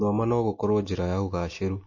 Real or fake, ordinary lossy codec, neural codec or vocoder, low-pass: real; MP3, 32 kbps; none; 7.2 kHz